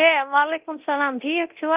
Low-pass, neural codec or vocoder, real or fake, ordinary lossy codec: 3.6 kHz; codec, 24 kHz, 0.9 kbps, DualCodec; fake; Opus, 32 kbps